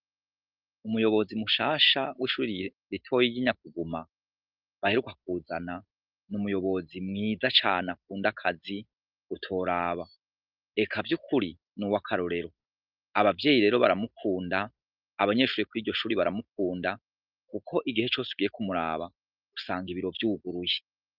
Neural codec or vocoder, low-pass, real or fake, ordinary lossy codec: none; 5.4 kHz; real; Opus, 24 kbps